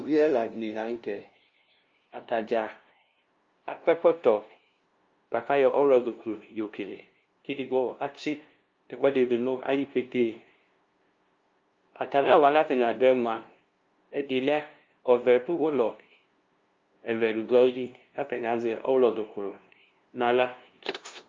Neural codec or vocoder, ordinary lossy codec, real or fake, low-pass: codec, 16 kHz, 0.5 kbps, FunCodec, trained on LibriTTS, 25 frames a second; Opus, 32 kbps; fake; 7.2 kHz